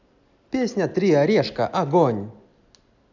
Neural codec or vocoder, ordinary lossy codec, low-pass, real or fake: none; none; 7.2 kHz; real